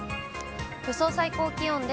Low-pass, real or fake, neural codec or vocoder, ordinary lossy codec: none; real; none; none